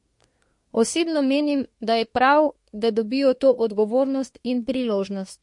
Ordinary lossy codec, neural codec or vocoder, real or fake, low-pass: MP3, 48 kbps; codec, 24 kHz, 1 kbps, SNAC; fake; 10.8 kHz